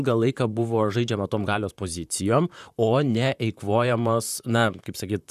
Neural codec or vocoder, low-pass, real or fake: vocoder, 44.1 kHz, 128 mel bands, Pupu-Vocoder; 14.4 kHz; fake